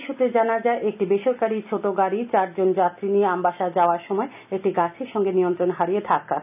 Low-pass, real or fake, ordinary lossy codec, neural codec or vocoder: 3.6 kHz; real; none; none